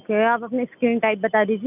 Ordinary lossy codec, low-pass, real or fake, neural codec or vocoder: none; 3.6 kHz; real; none